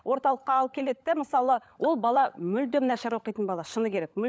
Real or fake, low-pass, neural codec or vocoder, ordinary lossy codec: fake; none; codec, 16 kHz, 16 kbps, FunCodec, trained on LibriTTS, 50 frames a second; none